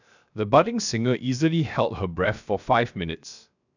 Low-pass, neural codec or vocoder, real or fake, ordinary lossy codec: 7.2 kHz; codec, 16 kHz, 0.7 kbps, FocalCodec; fake; none